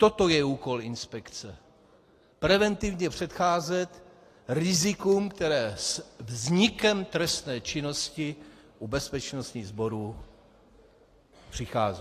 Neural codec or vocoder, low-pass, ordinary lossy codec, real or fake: none; 14.4 kHz; AAC, 48 kbps; real